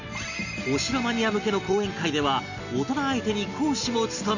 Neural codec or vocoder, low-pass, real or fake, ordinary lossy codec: none; 7.2 kHz; real; none